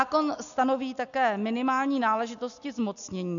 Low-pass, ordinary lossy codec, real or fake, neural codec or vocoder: 7.2 kHz; MP3, 96 kbps; real; none